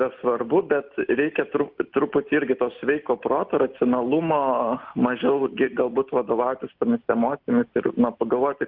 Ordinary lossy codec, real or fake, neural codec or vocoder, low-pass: Opus, 24 kbps; real; none; 5.4 kHz